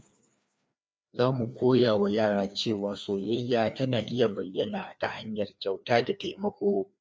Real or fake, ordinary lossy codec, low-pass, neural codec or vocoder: fake; none; none; codec, 16 kHz, 2 kbps, FreqCodec, larger model